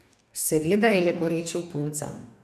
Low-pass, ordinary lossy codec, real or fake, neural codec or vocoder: 14.4 kHz; none; fake; codec, 44.1 kHz, 2.6 kbps, DAC